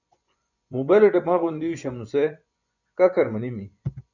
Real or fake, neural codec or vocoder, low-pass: fake; vocoder, 24 kHz, 100 mel bands, Vocos; 7.2 kHz